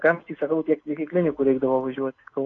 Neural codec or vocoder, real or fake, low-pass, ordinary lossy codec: none; real; 7.2 kHz; AAC, 32 kbps